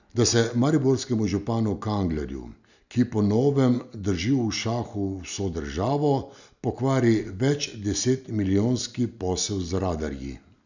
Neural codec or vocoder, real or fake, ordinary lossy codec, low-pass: none; real; none; 7.2 kHz